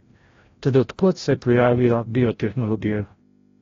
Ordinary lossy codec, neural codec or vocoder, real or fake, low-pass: AAC, 32 kbps; codec, 16 kHz, 0.5 kbps, FreqCodec, larger model; fake; 7.2 kHz